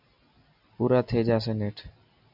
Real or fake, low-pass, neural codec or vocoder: real; 5.4 kHz; none